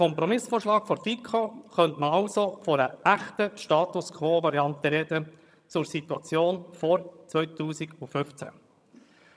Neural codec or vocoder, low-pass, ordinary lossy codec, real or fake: vocoder, 22.05 kHz, 80 mel bands, HiFi-GAN; none; none; fake